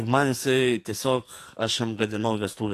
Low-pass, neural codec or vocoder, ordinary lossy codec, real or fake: 14.4 kHz; codec, 44.1 kHz, 2.6 kbps, SNAC; AAC, 64 kbps; fake